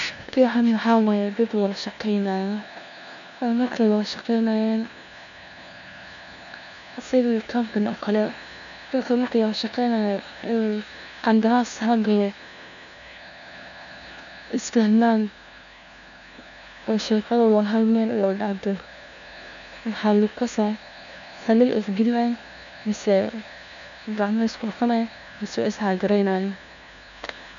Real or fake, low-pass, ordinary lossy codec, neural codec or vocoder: fake; 7.2 kHz; none; codec, 16 kHz, 1 kbps, FunCodec, trained on LibriTTS, 50 frames a second